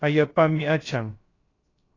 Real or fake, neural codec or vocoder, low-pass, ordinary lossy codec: fake; codec, 16 kHz, 0.3 kbps, FocalCodec; 7.2 kHz; AAC, 32 kbps